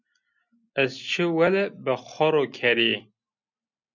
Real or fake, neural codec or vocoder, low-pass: real; none; 7.2 kHz